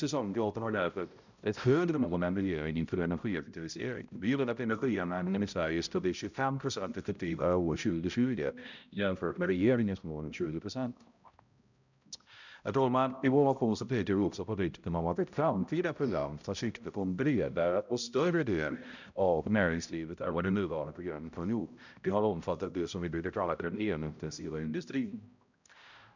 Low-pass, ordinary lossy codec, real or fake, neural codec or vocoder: 7.2 kHz; none; fake; codec, 16 kHz, 0.5 kbps, X-Codec, HuBERT features, trained on balanced general audio